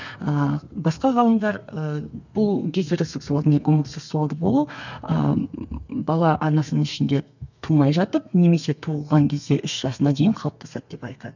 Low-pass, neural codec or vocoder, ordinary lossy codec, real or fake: 7.2 kHz; codec, 32 kHz, 1.9 kbps, SNAC; none; fake